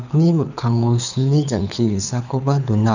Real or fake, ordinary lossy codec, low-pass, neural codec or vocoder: fake; none; 7.2 kHz; codec, 24 kHz, 6 kbps, HILCodec